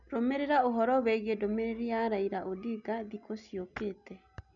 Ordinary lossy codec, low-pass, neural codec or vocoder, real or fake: none; 7.2 kHz; none; real